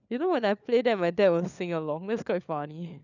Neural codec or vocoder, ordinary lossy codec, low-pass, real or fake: codec, 16 kHz, 4 kbps, FunCodec, trained on LibriTTS, 50 frames a second; none; 7.2 kHz; fake